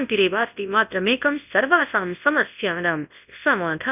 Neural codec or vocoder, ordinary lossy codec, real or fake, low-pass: codec, 24 kHz, 0.9 kbps, WavTokenizer, large speech release; none; fake; 3.6 kHz